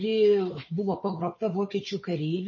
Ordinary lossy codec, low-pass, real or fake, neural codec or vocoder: MP3, 32 kbps; 7.2 kHz; fake; codec, 16 kHz, 4 kbps, FunCodec, trained on Chinese and English, 50 frames a second